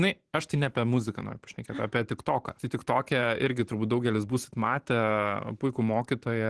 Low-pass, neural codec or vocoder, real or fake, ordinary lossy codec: 10.8 kHz; vocoder, 44.1 kHz, 128 mel bands every 512 samples, BigVGAN v2; fake; Opus, 16 kbps